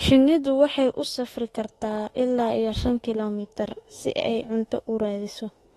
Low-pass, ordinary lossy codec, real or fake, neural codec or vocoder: 19.8 kHz; AAC, 32 kbps; fake; autoencoder, 48 kHz, 32 numbers a frame, DAC-VAE, trained on Japanese speech